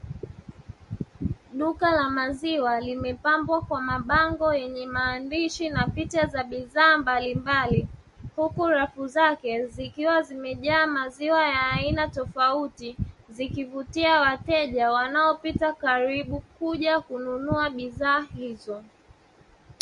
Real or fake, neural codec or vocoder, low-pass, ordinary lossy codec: real; none; 14.4 kHz; MP3, 48 kbps